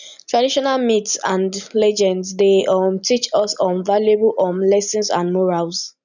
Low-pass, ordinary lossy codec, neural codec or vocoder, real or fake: 7.2 kHz; none; none; real